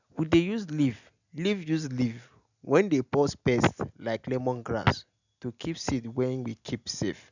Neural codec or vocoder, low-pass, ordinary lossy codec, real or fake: none; 7.2 kHz; none; real